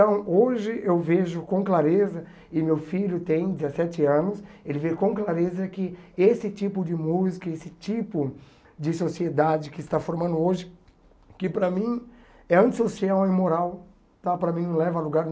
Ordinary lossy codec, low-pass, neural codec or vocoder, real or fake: none; none; none; real